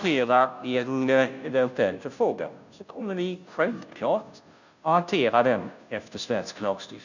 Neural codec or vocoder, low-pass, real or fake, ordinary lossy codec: codec, 16 kHz, 0.5 kbps, FunCodec, trained on Chinese and English, 25 frames a second; 7.2 kHz; fake; none